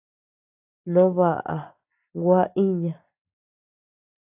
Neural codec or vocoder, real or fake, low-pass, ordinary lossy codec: none; real; 3.6 kHz; AAC, 24 kbps